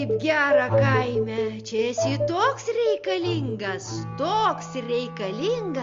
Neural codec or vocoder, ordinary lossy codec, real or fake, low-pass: none; Opus, 32 kbps; real; 7.2 kHz